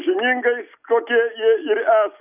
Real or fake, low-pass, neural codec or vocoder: real; 3.6 kHz; none